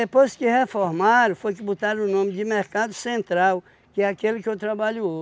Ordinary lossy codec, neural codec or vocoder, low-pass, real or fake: none; none; none; real